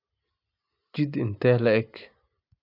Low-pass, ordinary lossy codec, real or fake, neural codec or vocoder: 5.4 kHz; none; real; none